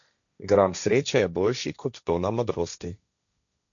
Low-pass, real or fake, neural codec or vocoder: 7.2 kHz; fake; codec, 16 kHz, 1.1 kbps, Voila-Tokenizer